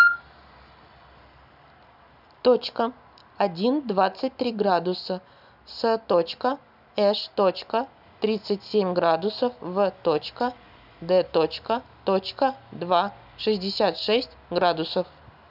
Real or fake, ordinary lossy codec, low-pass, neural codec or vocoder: real; none; 5.4 kHz; none